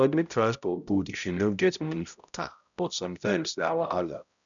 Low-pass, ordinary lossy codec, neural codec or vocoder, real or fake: 7.2 kHz; none; codec, 16 kHz, 0.5 kbps, X-Codec, HuBERT features, trained on balanced general audio; fake